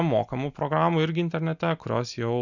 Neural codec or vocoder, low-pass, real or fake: none; 7.2 kHz; real